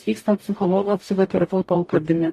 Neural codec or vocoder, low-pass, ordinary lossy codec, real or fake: codec, 44.1 kHz, 0.9 kbps, DAC; 14.4 kHz; AAC, 48 kbps; fake